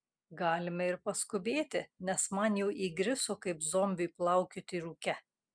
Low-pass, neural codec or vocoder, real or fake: 9.9 kHz; none; real